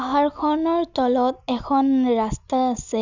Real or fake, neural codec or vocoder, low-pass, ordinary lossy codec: real; none; 7.2 kHz; none